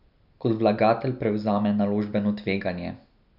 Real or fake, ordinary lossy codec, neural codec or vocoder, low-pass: real; none; none; 5.4 kHz